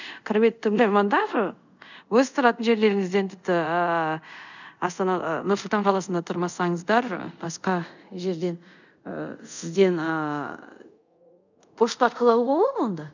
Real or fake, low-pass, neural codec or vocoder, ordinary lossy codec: fake; 7.2 kHz; codec, 24 kHz, 0.5 kbps, DualCodec; none